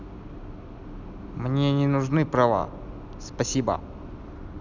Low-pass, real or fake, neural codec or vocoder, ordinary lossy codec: 7.2 kHz; real; none; none